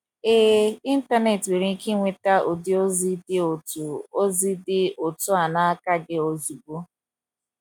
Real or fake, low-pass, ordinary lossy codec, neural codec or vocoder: real; 19.8 kHz; none; none